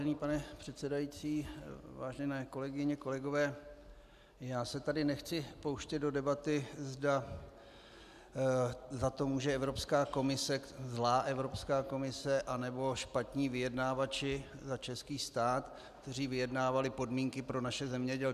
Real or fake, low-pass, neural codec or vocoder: real; 14.4 kHz; none